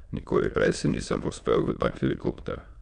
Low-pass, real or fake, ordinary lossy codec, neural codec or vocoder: 9.9 kHz; fake; none; autoencoder, 22.05 kHz, a latent of 192 numbers a frame, VITS, trained on many speakers